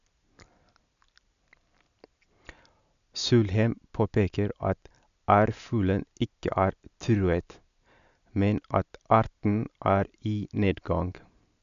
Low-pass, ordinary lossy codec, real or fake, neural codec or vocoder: 7.2 kHz; none; real; none